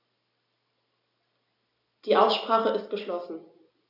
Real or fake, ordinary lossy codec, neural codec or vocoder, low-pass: real; AAC, 48 kbps; none; 5.4 kHz